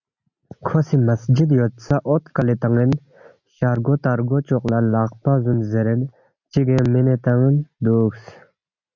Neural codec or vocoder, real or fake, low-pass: none; real; 7.2 kHz